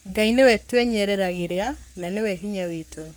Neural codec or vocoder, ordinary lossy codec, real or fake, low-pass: codec, 44.1 kHz, 3.4 kbps, Pupu-Codec; none; fake; none